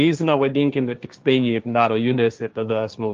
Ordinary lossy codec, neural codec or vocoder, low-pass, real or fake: Opus, 32 kbps; codec, 16 kHz, 1.1 kbps, Voila-Tokenizer; 7.2 kHz; fake